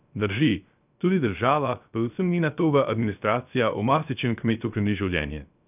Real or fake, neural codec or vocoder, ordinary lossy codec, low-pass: fake; codec, 16 kHz, 0.3 kbps, FocalCodec; none; 3.6 kHz